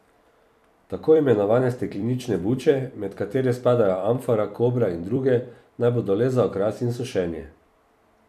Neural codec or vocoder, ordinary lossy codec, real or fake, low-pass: vocoder, 44.1 kHz, 128 mel bands every 256 samples, BigVGAN v2; none; fake; 14.4 kHz